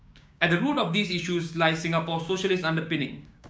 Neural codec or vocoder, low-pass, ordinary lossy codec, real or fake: codec, 16 kHz, 6 kbps, DAC; none; none; fake